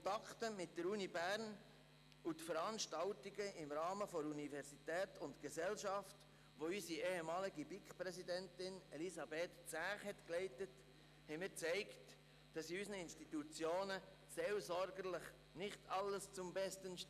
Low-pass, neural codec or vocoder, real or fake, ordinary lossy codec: 14.4 kHz; none; real; none